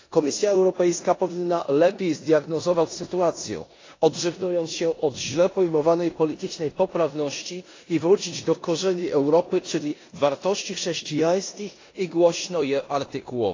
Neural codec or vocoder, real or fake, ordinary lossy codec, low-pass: codec, 16 kHz in and 24 kHz out, 0.9 kbps, LongCat-Audio-Codec, four codebook decoder; fake; AAC, 32 kbps; 7.2 kHz